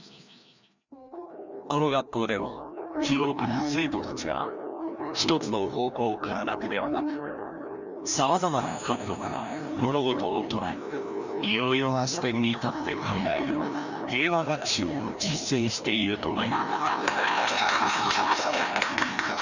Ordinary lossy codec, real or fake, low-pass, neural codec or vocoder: none; fake; 7.2 kHz; codec, 16 kHz, 1 kbps, FreqCodec, larger model